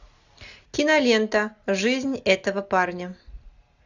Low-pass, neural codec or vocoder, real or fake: 7.2 kHz; none; real